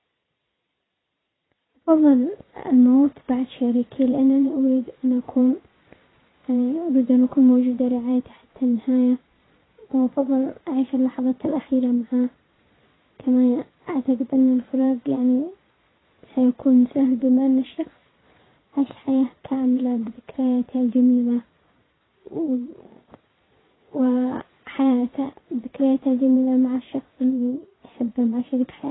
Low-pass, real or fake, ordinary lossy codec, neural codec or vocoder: 7.2 kHz; fake; AAC, 16 kbps; vocoder, 22.05 kHz, 80 mel bands, Vocos